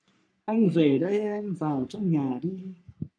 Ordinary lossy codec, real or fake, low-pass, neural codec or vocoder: MP3, 96 kbps; fake; 9.9 kHz; codec, 44.1 kHz, 3.4 kbps, Pupu-Codec